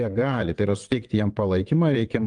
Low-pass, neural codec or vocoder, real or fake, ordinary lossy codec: 9.9 kHz; vocoder, 22.05 kHz, 80 mel bands, WaveNeXt; fake; Opus, 32 kbps